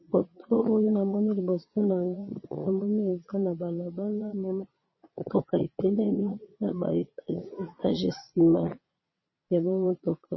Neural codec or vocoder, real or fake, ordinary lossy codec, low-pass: vocoder, 22.05 kHz, 80 mel bands, Vocos; fake; MP3, 24 kbps; 7.2 kHz